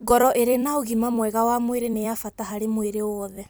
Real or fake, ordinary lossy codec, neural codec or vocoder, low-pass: fake; none; vocoder, 44.1 kHz, 128 mel bands every 256 samples, BigVGAN v2; none